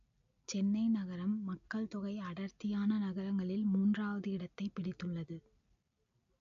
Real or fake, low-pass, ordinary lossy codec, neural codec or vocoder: real; 7.2 kHz; AAC, 64 kbps; none